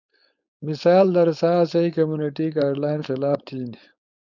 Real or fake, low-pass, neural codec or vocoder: fake; 7.2 kHz; codec, 16 kHz, 4.8 kbps, FACodec